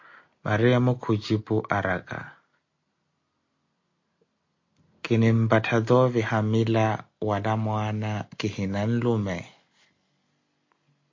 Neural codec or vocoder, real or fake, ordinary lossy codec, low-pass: none; real; MP3, 48 kbps; 7.2 kHz